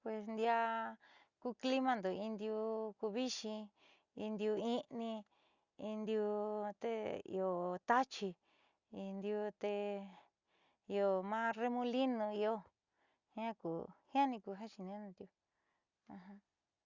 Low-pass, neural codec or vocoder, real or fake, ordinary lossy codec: 7.2 kHz; none; real; Opus, 64 kbps